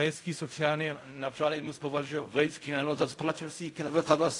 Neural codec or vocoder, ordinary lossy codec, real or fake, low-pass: codec, 16 kHz in and 24 kHz out, 0.4 kbps, LongCat-Audio-Codec, fine tuned four codebook decoder; AAC, 48 kbps; fake; 10.8 kHz